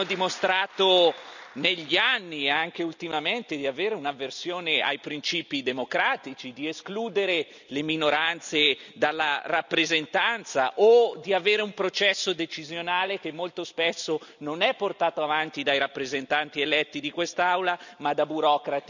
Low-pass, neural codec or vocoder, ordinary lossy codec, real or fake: 7.2 kHz; none; none; real